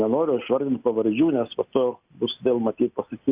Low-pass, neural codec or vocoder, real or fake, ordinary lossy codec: 3.6 kHz; none; real; Opus, 64 kbps